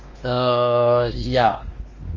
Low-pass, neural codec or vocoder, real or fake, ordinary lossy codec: 7.2 kHz; codec, 16 kHz, 2 kbps, X-Codec, WavLM features, trained on Multilingual LibriSpeech; fake; Opus, 32 kbps